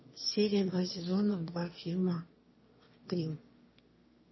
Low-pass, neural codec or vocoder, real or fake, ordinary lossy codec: 7.2 kHz; autoencoder, 22.05 kHz, a latent of 192 numbers a frame, VITS, trained on one speaker; fake; MP3, 24 kbps